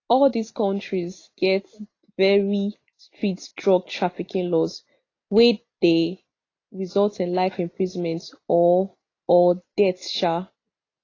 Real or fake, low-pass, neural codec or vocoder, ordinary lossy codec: real; 7.2 kHz; none; AAC, 32 kbps